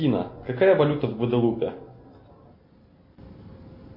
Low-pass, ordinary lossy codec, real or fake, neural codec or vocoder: 5.4 kHz; MP3, 32 kbps; real; none